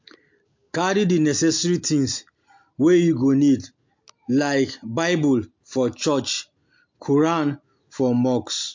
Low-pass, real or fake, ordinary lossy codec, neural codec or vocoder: 7.2 kHz; real; MP3, 48 kbps; none